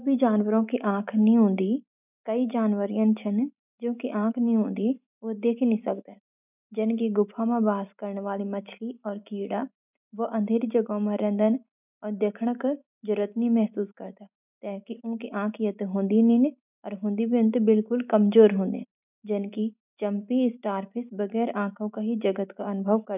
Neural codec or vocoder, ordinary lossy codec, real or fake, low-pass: none; none; real; 3.6 kHz